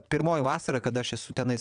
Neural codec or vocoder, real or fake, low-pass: vocoder, 22.05 kHz, 80 mel bands, WaveNeXt; fake; 9.9 kHz